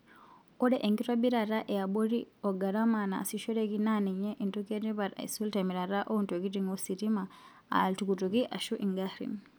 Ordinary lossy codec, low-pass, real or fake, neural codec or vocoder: none; none; real; none